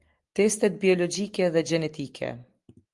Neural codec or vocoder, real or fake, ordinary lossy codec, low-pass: none; real; Opus, 24 kbps; 10.8 kHz